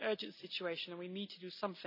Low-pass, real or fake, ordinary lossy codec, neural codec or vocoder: 5.4 kHz; real; none; none